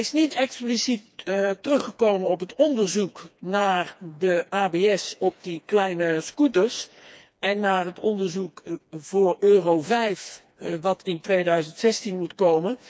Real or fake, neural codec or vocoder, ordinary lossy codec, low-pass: fake; codec, 16 kHz, 2 kbps, FreqCodec, smaller model; none; none